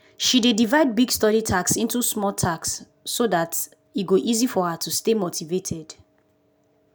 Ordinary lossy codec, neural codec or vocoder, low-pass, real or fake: none; none; none; real